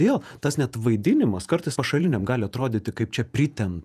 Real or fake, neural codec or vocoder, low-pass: fake; vocoder, 48 kHz, 128 mel bands, Vocos; 14.4 kHz